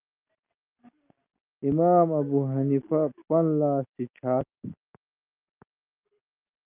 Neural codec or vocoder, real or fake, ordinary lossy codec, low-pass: none; real; Opus, 24 kbps; 3.6 kHz